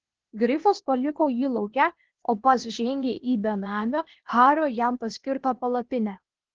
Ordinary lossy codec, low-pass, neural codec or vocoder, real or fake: Opus, 16 kbps; 7.2 kHz; codec, 16 kHz, 0.8 kbps, ZipCodec; fake